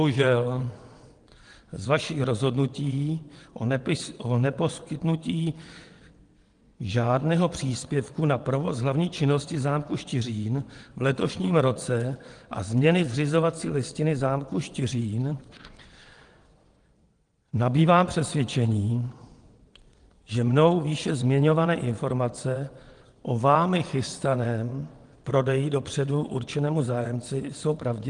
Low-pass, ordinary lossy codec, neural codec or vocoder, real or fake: 9.9 kHz; Opus, 24 kbps; vocoder, 22.05 kHz, 80 mel bands, WaveNeXt; fake